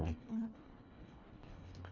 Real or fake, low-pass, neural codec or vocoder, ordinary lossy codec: fake; 7.2 kHz; codec, 24 kHz, 1.5 kbps, HILCodec; none